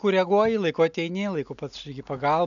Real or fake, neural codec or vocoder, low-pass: real; none; 7.2 kHz